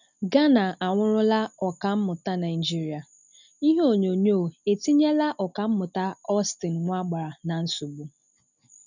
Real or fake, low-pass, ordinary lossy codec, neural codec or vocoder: real; 7.2 kHz; none; none